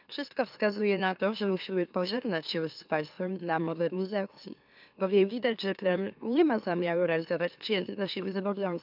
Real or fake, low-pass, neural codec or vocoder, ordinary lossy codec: fake; 5.4 kHz; autoencoder, 44.1 kHz, a latent of 192 numbers a frame, MeloTTS; none